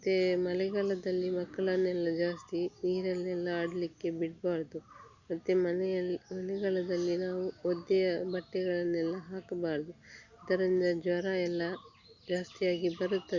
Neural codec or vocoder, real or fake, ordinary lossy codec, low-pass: none; real; Opus, 64 kbps; 7.2 kHz